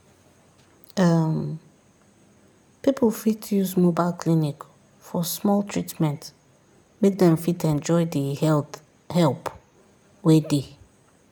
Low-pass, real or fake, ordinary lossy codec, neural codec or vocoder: none; real; none; none